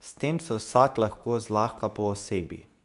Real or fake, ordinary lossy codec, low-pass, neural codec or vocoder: fake; none; 10.8 kHz; codec, 24 kHz, 0.9 kbps, WavTokenizer, medium speech release version 2